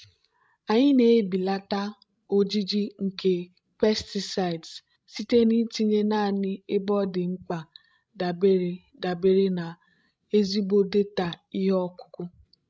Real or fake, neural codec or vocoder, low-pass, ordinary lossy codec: fake; codec, 16 kHz, 16 kbps, FreqCodec, larger model; none; none